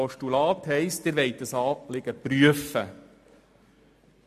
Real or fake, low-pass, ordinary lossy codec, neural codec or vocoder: real; 14.4 kHz; MP3, 64 kbps; none